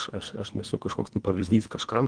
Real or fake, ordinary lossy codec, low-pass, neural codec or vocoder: fake; Opus, 32 kbps; 9.9 kHz; codec, 24 kHz, 1.5 kbps, HILCodec